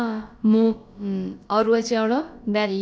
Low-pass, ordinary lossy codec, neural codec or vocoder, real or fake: none; none; codec, 16 kHz, about 1 kbps, DyCAST, with the encoder's durations; fake